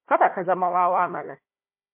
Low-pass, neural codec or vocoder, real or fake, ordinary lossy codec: 3.6 kHz; codec, 16 kHz, 1 kbps, FunCodec, trained on Chinese and English, 50 frames a second; fake; MP3, 24 kbps